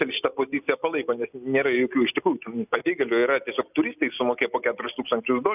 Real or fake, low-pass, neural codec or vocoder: real; 3.6 kHz; none